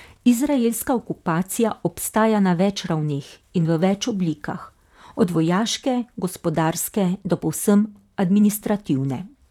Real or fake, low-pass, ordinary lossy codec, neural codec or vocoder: fake; 19.8 kHz; none; vocoder, 44.1 kHz, 128 mel bands, Pupu-Vocoder